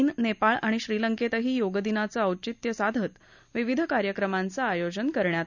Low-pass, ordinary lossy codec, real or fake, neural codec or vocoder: 7.2 kHz; none; real; none